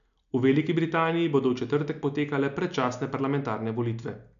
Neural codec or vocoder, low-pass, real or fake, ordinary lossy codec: none; 7.2 kHz; real; none